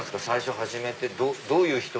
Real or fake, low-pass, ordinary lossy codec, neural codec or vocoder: real; none; none; none